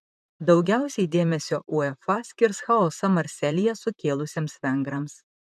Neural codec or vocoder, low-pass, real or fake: vocoder, 44.1 kHz, 128 mel bands, Pupu-Vocoder; 14.4 kHz; fake